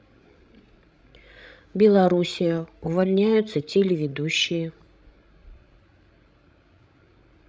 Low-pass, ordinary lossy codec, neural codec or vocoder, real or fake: none; none; codec, 16 kHz, 16 kbps, FreqCodec, larger model; fake